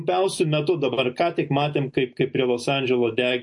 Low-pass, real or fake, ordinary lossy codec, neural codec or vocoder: 10.8 kHz; real; MP3, 48 kbps; none